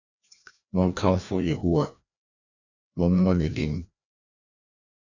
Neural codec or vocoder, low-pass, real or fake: codec, 16 kHz, 1 kbps, FreqCodec, larger model; 7.2 kHz; fake